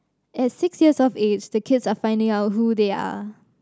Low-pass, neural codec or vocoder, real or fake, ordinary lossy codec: none; none; real; none